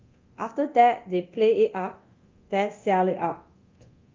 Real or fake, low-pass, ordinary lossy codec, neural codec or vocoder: fake; 7.2 kHz; Opus, 24 kbps; codec, 24 kHz, 0.9 kbps, DualCodec